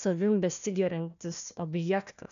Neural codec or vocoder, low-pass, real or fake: codec, 16 kHz, 1 kbps, FunCodec, trained on Chinese and English, 50 frames a second; 7.2 kHz; fake